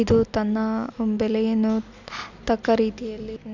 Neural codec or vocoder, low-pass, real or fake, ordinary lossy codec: none; 7.2 kHz; real; none